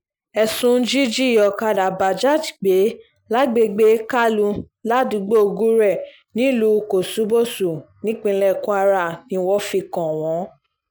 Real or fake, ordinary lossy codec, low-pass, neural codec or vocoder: real; none; none; none